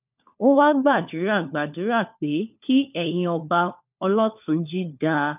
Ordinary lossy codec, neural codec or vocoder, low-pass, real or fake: none; codec, 16 kHz, 4 kbps, FunCodec, trained on LibriTTS, 50 frames a second; 3.6 kHz; fake